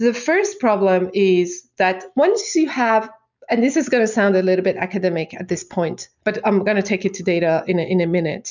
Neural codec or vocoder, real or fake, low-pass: none; real; 7.2 kHz